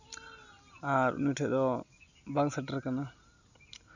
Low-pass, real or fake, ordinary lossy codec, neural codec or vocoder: 7.2 kHz; real; none; none